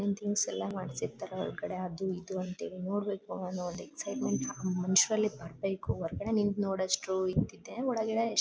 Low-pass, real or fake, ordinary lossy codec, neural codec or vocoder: none; real; none; none